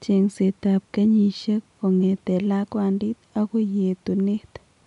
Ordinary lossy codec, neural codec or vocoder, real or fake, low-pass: MP3, 96 kbps; none; real; 10.8 kHz